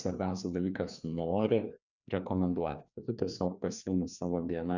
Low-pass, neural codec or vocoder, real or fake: 7.2 kHz; codec, 16 kHz, 2 kbps, FreqCodec, larger model; fake